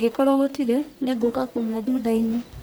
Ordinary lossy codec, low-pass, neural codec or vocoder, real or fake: none; none; codec, 44.1 kHz, 1.7 kbps, Pupu-Codec; fake